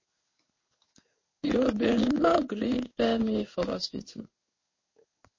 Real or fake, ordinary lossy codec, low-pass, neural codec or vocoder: fake; MP3, 32 kbps; 7.2 kHz; codec, 16 kHz in and 24 kHz out, 1 kbps, XY-Tokenizer